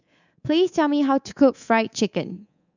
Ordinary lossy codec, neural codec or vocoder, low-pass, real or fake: none; codec, 24 kHz, 3.1 kbps, DualCodec; 7.2 kHz; fake